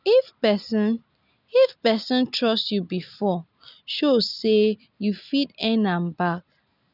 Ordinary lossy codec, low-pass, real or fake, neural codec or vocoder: none; 5.4 kHz; real; none